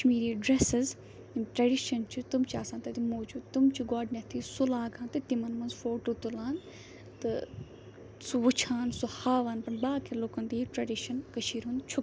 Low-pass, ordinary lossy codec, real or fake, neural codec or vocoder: none; none; real; none